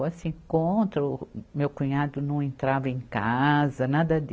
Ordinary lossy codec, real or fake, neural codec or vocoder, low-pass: none; real; none; none